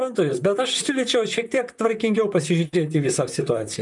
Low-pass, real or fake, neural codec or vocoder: 10.8 kHz; fake; vocoder, 44.1 kHz, 128 mel bands, Pupu-Vocoder